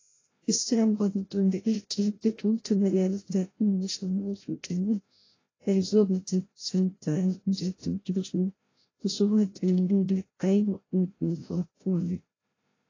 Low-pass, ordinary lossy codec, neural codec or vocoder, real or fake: 7.2 kHz; AAC, 32 kbps; codec, 16 kHz, 0.5 kbps, FreqCodec, larger model; fake